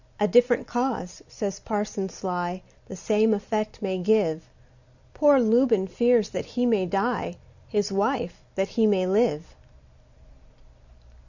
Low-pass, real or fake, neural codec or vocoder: 7.2 kHz; real; none